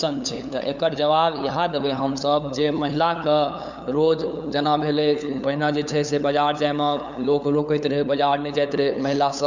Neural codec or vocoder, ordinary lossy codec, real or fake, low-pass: codec, 16 kHz, 8 kbps, FunCodec, trained on LibriTTS, 25 frames a second; none; fake; 7.2 kHz